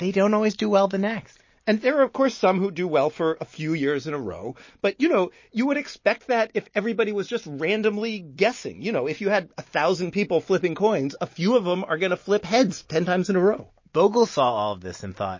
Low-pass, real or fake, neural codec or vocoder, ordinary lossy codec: 7.2 kHz; real; none; MP3, 32 kbps